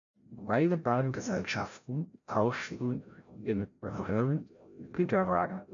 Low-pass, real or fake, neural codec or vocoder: 7.2 kHz; fake; codec, 16 kHz, 0.5 kbps, FreqCodec, larger model